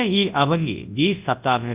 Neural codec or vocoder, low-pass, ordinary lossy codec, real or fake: codec, 24 kHz, 0.9 kbps, WavTokenizer, large speech release; 3.6 kHz; Opus, 24 kbps; fake